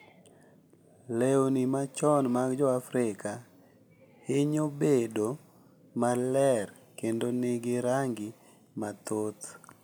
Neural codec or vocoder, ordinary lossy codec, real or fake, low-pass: none; none; real; none